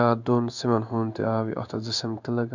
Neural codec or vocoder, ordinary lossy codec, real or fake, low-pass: codec, 16 kHz in and 24 kHz out, 1 kbps, XY-Tokenizer; none; fake; 7.2 kHz